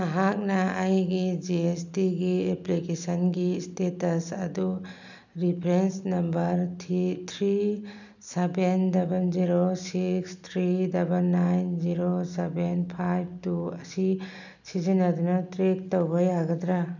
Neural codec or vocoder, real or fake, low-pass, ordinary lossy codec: none; real; 7.2 kHz; none